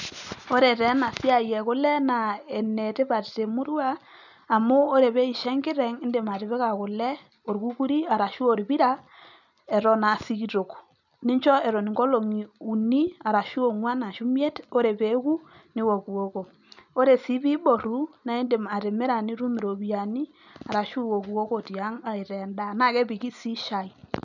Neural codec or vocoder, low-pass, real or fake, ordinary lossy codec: none; 7.2 kHz; real; none